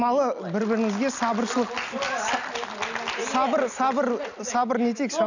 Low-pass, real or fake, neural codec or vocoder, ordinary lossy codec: 7.2 kHz; real; none; none